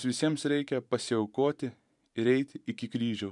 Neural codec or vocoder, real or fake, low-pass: vocoder, 44.1 kHz, 128 mel bands every 512 samples, BigVGAN v2; fake; 10.8 kHz